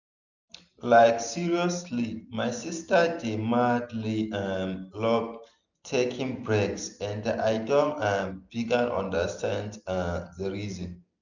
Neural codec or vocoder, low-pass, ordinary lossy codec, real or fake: none; 7.2 kHz; none; real